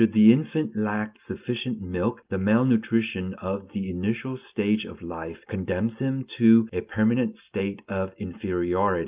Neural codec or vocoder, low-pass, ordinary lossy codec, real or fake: none; 3.6 kHz; Opus, 24 kbps; real